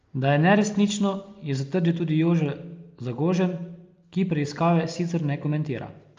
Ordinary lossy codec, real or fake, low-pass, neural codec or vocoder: Opus, 24 kbps; real; 7.2 kHz; none